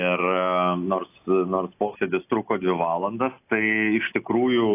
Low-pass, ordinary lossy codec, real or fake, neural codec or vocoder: 3.6 kHz; AAC, 24 kbps; real; none